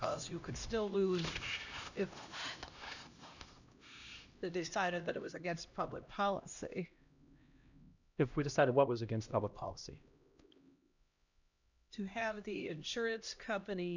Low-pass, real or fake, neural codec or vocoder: 7.2 kHz; fake; codec, 16 kHz, 1 kbps, X-Codec, HuBERT features, trained on LibriSpeech